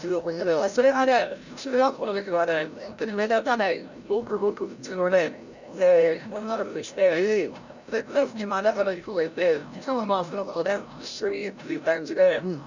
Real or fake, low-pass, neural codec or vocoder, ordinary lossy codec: fake; 7.2 kHz; codec, 16 kHz, 0.5 kbps, FreqCodec, larger model; none